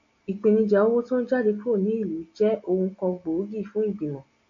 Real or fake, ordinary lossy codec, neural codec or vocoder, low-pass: real; MP3, 48 kbps; none; 7.2 kHz